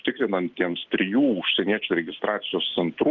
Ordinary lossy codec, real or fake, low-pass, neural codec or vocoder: Opus, 16 kbps; real; 7.2 kHz; none